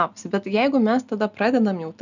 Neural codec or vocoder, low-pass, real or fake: none; 7.2 kHz; real